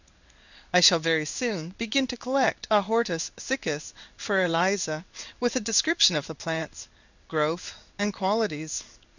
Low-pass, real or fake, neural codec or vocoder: 7.2 kHz; fake; codec, 16 kHz in and 24 kHz out, 1 kbps, XY-Tokenizer